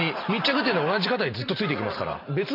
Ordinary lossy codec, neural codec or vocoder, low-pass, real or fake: MP3, 48 kbps; none; 5.4 kHz; real